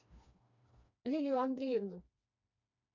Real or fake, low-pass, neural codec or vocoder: fake; 7.2 kHz; codec, 16 kHz, 2 kbps, FreqCodec, smaller model